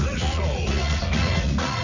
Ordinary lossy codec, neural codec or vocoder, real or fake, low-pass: AAC, 48 kbps; vocoder, 44.1 kHz, 80 mel bands, Vocos; fake; 7.2 kHz